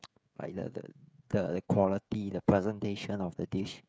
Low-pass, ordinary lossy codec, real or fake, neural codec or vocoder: none; none; fake; codec, 16 kHz, 16 kbps, FreqCodec, smaller model